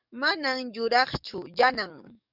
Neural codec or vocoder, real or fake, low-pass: codec, 44.1 kHz, 7.8 kbps, DAC; fake; 5.4 kHz